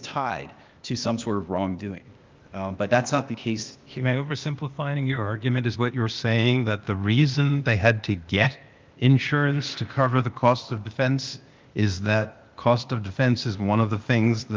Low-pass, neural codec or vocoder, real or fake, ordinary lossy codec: 7.2 kHz; codec, 16 kHz, 0.8 kbps, ZipCodec; fake; Opus, 24 kbps